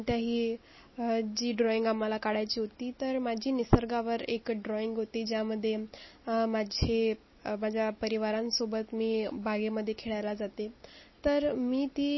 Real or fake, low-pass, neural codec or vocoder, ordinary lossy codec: real; 7.2 kHz; none; MP3, 24 kbps